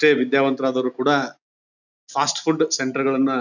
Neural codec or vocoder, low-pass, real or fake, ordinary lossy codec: none; 7.2 kHz; real; none